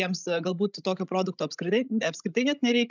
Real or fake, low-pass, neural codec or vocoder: fake; 7.2 kHz; codec, 16 kHz, 16 kbps, FreqCodec, larger model